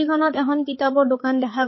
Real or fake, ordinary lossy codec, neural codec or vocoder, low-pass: fake; MP3, 24 kbps; codec, 16 kHz, 4 kbps, X-Codec, HuBERT features, trained on balanced general audio; 7.2 kHz